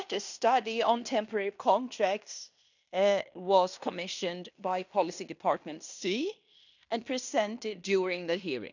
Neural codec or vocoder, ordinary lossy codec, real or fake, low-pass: codec, 16 kHz in and 24 kHz out, 0.9 kbps, LongCat-Audio-Codec, fine tuned four codebook decoder; none; fake; 7.2 kHz